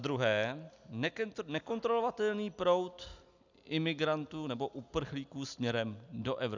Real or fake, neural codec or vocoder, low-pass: real; none; 7.2 kHz